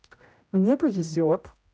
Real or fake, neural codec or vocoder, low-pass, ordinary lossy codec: fake; codec, 16 kHz, 0.5 kbps, X-Codec, HuBERT features, trained on general audio; none; none